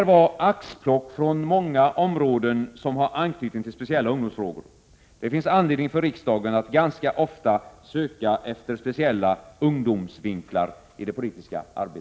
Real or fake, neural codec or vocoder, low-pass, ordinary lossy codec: real; none; none; none